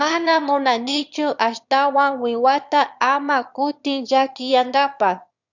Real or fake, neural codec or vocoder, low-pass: fake; autoencoder, 22.05 kHz, a latent of 192 numbers a frame, VITS, trained on one speaker; 7.2 kHz